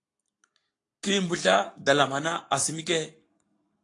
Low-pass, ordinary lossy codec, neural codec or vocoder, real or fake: 10.8 kHz; AAC, 48 kbps; codec, 44.1 kHz, 7.8 kbps, Pupu-Codec; fake